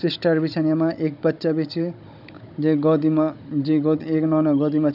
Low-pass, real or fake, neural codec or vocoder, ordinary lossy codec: 5.4 kHz; fake; codec, 16 kHz, 16 kbps, FunCodec, trained on Chinese and English, 50 frames a second; none